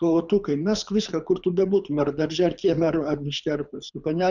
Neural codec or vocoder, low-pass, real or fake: codec, 24 kHz, 6 kbps, HILCodec; 7.2 kHz; fake